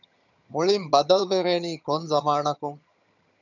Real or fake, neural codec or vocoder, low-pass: fake; vocoder, 22.05 kHz, 80 mel bands, HiFi-GAN; 7.2 kHz